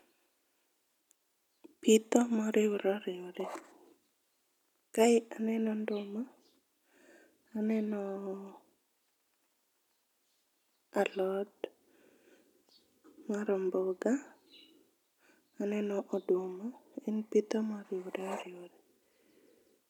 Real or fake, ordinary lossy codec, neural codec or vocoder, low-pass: real; none; none; 19.8 kHz